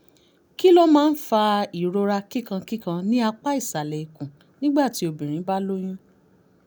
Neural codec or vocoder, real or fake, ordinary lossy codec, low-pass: none; real; none; none